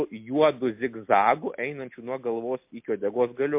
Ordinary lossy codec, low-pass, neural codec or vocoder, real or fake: MP3, 32 kbps; 3.6 kHz; none; real